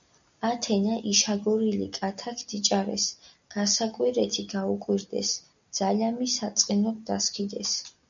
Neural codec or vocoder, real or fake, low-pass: none; real; 7.2 kHz